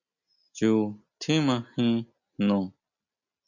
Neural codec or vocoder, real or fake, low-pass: none; real; 7.2 kHz